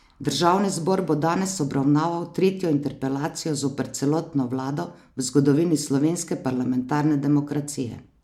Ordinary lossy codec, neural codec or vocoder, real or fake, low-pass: MP3, 96 kbps; none; real; 19.8 kHz